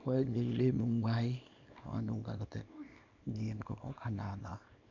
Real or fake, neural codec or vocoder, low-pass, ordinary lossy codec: fake; codec, 24 kHz, 0.9 kbps, WavTokenizer, small release; 7.2 kHz; none